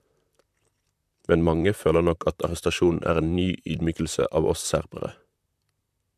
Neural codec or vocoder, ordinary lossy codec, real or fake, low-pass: vocoder, 44.1 kHz, 128 mel bands, Pupu-Vocoder; MP3, 96 kbps; fake; 14.4 kHz